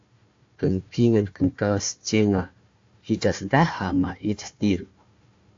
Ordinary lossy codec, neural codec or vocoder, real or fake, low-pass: AAC, 48 kbps; codec, 16 kHz, 1 kbps, FunCodec, trained on Chinese and English, 50 frames a second; fake; 7.2 kHz